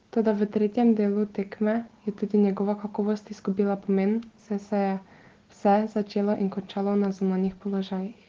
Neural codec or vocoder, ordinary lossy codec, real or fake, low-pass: none; Opus, 16 kbps; real; 7.2 kHz